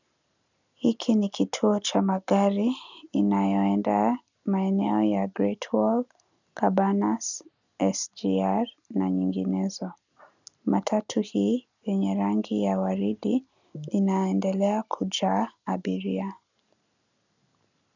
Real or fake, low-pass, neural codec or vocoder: real; 7.2 kHz; none